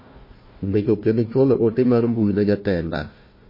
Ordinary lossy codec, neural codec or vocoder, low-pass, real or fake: MP3, 24 kbps; codec, 16 kHz, 1 kbps, FunCodec, trained on Chinese and English, 50 frames a second; 5.4 kHz; fake